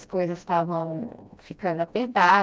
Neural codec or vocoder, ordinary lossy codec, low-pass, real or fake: codec, 16 kHz, 1 kbps, FreqCodec, smaller model; none; none; fake